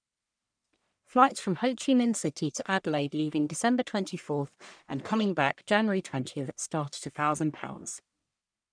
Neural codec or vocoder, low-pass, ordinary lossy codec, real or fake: codec, 44.1 kHz, 1.7 kbps, Pupu-Codec; 9.9 kHz; none; fake